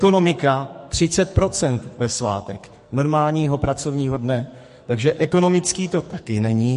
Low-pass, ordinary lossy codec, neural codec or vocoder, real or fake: 14.4 kHz; MP3, 48 kbps; codec, 44.1 kHz, 2.6 kbps, SNAC; fake